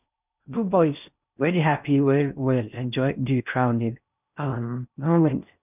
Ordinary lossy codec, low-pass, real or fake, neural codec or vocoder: none; 3.6 kHz; fake; codec, 16 kHz in and 24 kHz out, 0.6 kbps, FocalCodec, streaming, 4096 codes